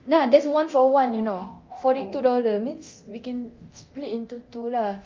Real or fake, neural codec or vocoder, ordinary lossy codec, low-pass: fake; codec, 24 kHz, 0.9 kbps, DualCodec; Opus, 32 kbps; 7.2 kHz